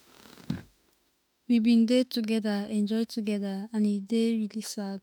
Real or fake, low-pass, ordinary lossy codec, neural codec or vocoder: fake; none; none; autoencoder, 48 kHz, 32 numbers a frame, DAC-VAE, trained on Japanese speech